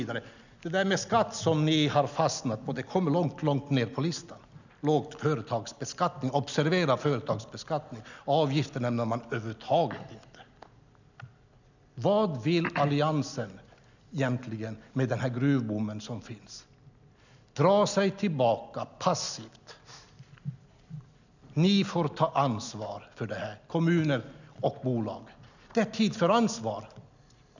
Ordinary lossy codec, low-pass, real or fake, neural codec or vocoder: none; 7.2 kHz; real; none